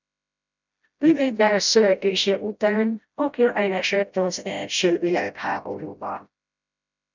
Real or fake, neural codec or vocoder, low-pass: fake; codec, 16 kHz, 0.5 kbps, FreqCodec, smaller model; 7.2 kHz